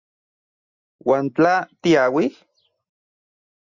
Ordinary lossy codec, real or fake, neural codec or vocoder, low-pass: Opus, 64 kbps; real; none; 7.2 kHz